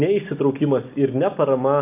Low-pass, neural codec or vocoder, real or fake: 3.6 kHz; none; real